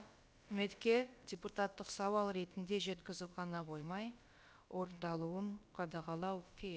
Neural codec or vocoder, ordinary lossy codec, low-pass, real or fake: codec, 16 kHz, about 1 kbps, DyCAST, with the encoder's durations; none; none; fake